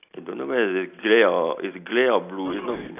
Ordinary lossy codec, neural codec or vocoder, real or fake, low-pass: none; none; real; 3.6 kHz